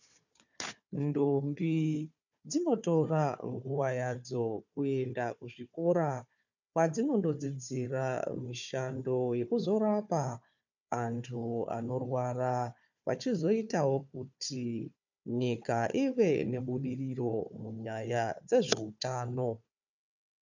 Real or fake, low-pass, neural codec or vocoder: fake; 7.2 kHz; codec, 16 kHz, 4 kbps, FunCodec, trained on Chinese and English, 50 frames a second